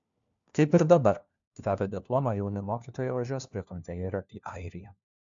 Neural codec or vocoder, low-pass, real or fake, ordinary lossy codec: codec, 16 kHz, 1 kbps, FunCodec, trained on LibriTTS, 50 frames a second; 7.2 kHz; fake; MP3, 64 kbps